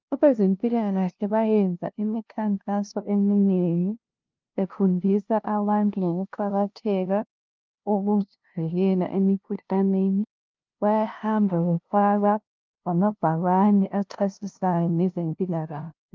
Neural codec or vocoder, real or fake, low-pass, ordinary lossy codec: codec, 16 kHz, 0.5 kbps, FunCodec, trained on LibriTTS, 25 frames a second; fake; 7.2 kHz; Opus, 24 kbps